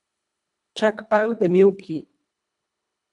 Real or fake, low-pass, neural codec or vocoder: fake; 10.8 kHz; codec, 24 kHz, 1.5 kbps, HILCodec